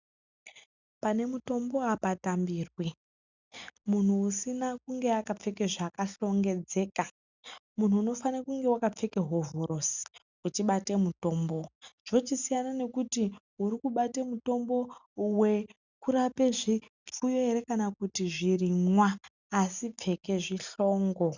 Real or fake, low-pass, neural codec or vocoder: real; 7.2 kHz; none